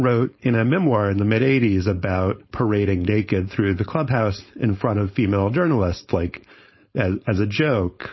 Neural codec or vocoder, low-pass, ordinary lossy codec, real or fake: codec, 16 kHz, 4.8 kbps, FACodec; 7.2 kHz; MP3, 24 kbps; fake